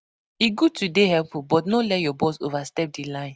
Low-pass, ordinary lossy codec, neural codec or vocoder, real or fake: none; none; none; real